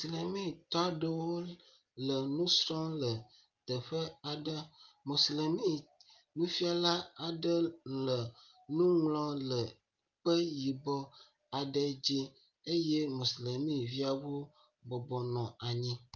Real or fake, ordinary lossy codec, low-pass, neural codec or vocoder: real; Opus, 24 kbps; 7.2 kHz; none